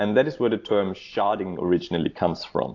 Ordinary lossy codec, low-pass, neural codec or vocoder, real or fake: AAC, 48 kbps; 7.2 kHz; none; real